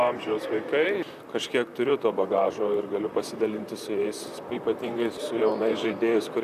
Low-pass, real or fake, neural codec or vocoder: 14.4 kHz; fake; vocoder, 44.1 kHz, 128 mel bands, Pupu-Vocoder